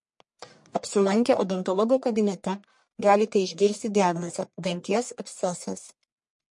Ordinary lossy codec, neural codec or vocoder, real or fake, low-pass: MP3, 48 kbps; codec, 44.1 kHz, 1.7 kbps, Pupu-Codec; fake; 10.8 kHz